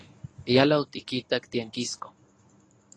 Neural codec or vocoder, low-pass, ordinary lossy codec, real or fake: codec, 24 kHz, 0.9 kbps, WavTokenizer, medium speech release version 1; 9.9 kHz; AAC, 32 kbps; fake